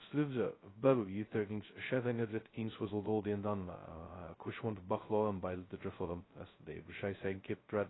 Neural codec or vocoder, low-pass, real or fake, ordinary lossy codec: codec, 16 kHz, 0.2 kbps, FocalCodec; 7.2 kHz; fake; AAC, 16 kbps